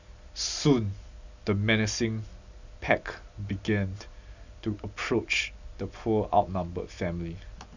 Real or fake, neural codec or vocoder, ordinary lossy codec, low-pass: real; none; none; 7.2 kHz